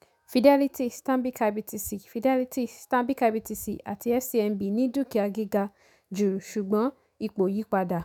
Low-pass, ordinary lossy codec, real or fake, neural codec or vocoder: none; none; fake; autoencoder, 48 kHz, 128 numbers a frame, DAC-VAE, trained on Japanese speech